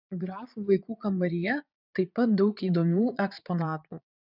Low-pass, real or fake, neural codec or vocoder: 5.4 kHz; fake; vocoder, 22.05 kHz, 80 mel bands, Vocos